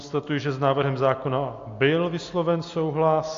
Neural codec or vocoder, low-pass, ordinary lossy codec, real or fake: none; 7.2 kHz; AAC, 48 kbps; real